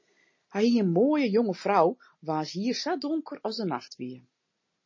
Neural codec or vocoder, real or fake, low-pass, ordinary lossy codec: none; real; 7.2 kHz; MP3, 32 kbps